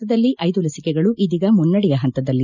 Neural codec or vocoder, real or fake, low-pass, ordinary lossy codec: none; real; 7.2 kHz; none